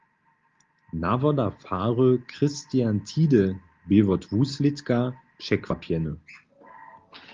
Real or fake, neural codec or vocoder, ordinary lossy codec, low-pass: real; none; Opus, 32 kbps; 7.2 kHz